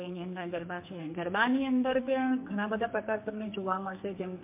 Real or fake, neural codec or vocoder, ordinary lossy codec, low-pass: fake; codec, 44.1 kHz, 2.6 kbps, SNAC; MP3, 32 kbps; 3.6 kHz